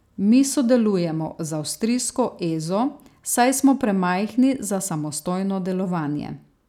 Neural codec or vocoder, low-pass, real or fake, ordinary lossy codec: none; 19.8 kHz; real; none